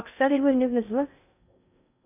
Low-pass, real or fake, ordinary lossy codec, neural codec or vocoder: 3.6 kHz; fake; none; codec, 16 kHz in and 24 kHz out, 0.6 kbps, FocalCodec, streaming, 2048 codes